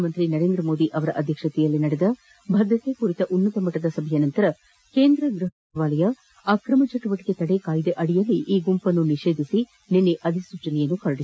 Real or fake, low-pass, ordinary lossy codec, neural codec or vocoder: real; none; none; none